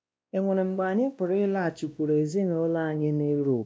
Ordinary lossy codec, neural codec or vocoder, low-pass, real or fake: none; codec, 16 kHz, 1 kbps, X-Codec, WavLM features, trained on Multilingual LibriSpeech; none; fake